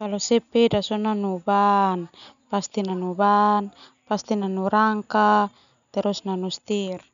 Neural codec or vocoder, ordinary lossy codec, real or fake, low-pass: none; none; real; 7.2 kHz